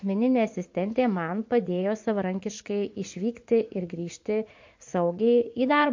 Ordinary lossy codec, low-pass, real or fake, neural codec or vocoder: MP3, 48 kbps; 7.2 kHz; fake; vocoder, 44.1 kHz, 80 mel bands, Vocos